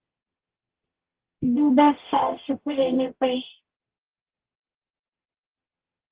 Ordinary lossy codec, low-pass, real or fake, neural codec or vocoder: Opus, 16 kbps; 3.6 kHz; fake; codec, 44.1 kHz, 0.9 kbps, DAC